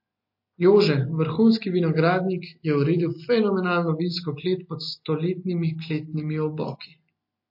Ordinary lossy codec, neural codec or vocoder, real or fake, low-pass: MP3, 32 kbps; none; real; 5.4 kHz